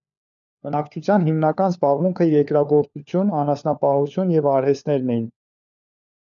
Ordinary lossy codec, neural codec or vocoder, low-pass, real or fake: MP3, 96 kbps; codec, 16 kHz, 4 kbps, FunCodec, trained on LibriTTS, 50 frames a second; 7.2 kHz; fake